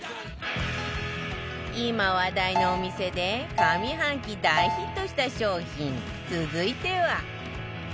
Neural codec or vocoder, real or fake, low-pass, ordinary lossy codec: none; real; none; none